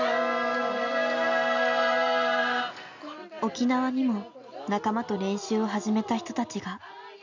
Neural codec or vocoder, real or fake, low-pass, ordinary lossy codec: none; real; 7.2 kHz; AAC, 48 kbps